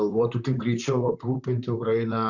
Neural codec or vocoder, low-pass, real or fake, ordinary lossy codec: vocoder, 44.1 kHz, 128 mel bands, Pupu-Vocoder; 7.2 kHz; fake; Opus, 64 kbps